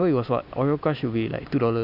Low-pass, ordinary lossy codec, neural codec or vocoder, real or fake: 5.4 kHz; none; none; real